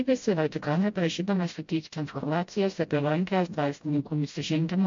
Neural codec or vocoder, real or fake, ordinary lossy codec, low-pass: codec, 16 kHz, 0.5 kbps, FreqCodec, smaller model; fake; MP3, 48 kbps; 7.2 kHz